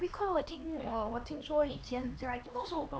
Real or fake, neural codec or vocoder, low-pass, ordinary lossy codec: fake; codec, 16 kHz, 2 kbps, X-Codec, HuBERT features, trained on LibriSpeech; none; none